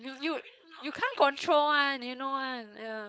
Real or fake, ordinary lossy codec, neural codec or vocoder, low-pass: fake; none; codec, 16 kHz, 4.8 kbps, FACodec; none